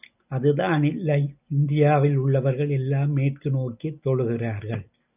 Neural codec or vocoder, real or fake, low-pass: none; real; 3.6 kHz